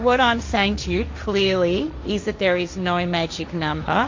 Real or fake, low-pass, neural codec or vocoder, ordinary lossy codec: fake; 7.2 kHz; codec, 16 kHz, 1.1 kbps, Voila-Tokenizer; MP3, 48 kbps